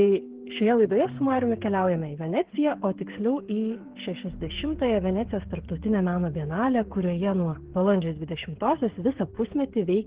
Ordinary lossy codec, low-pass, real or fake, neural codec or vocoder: Opus, 16 kbps; 3.6 kHz; fake; codec, 16 kHz, 8 kbps, FreqCodec, smaller model